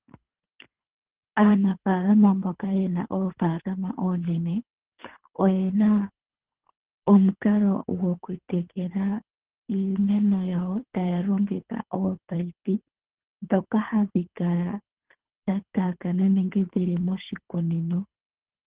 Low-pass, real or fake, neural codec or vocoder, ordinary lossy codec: 3.6 kHz; fake; codec, 24 kHz, 3 kbps, HILCodec; Opus, 16 kbps